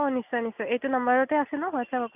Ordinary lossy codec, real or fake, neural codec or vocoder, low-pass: none; real; none; 3.6 kHz